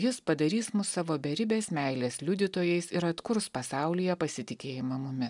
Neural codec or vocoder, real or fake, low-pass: none; real; 10.8 kHz